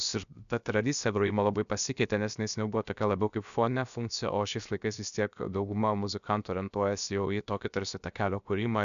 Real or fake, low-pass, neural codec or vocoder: fake; 7.2 kHz; codec, 16 kHz, 0.7 kbps, FocalCodec